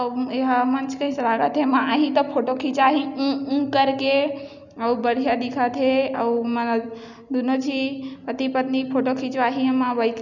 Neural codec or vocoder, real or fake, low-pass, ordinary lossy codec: none; real; 7.2 kHz; none